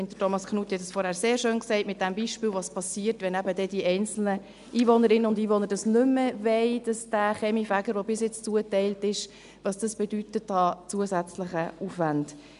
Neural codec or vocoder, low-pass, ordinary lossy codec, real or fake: none; 10.8 kHz; none; real